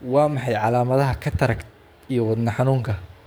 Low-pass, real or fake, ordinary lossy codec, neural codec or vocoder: none; fake; none; codec, 44.1 kHz, 7.8 kbps, DAC